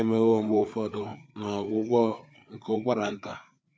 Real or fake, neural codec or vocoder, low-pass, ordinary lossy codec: fake; codec, 16 kHz, 4 kbps, FreqCodec, larger model; none; none